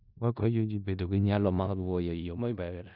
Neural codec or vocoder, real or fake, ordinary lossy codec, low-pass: codec, 16 kHz in and 24 kHz out, 0.4 kbps, LongCat-Audio-Codec, four codebook decoder; fake; none; 5.4 kHz